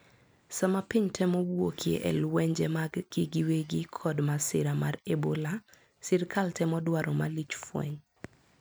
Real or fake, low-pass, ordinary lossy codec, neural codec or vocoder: real; none; none; none